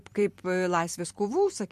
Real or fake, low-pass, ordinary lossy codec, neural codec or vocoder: fake; 14.4 kHz; MP3, 64 kbps; vocoder, 44.1 kHz, 128 mel bands every 256 samples, BigVGAN v2